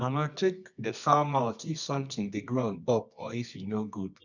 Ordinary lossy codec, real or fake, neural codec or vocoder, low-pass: none; fake; codec, 24 kHz, 0.9 kbps, WavTokenizer, medium music audio release; 7.2 kHz